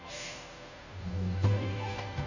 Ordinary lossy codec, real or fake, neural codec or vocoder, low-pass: none; fake; codec, 16 kHz, 0.5 kbps, FunCodec, trained on Chinese and English, 25 frames a second; 7.2 kHz